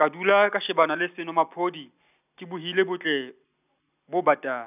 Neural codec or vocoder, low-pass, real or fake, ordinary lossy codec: none; 3.6 kHz; real; none